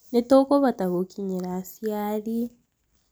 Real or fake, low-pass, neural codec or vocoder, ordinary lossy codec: real; none; none; none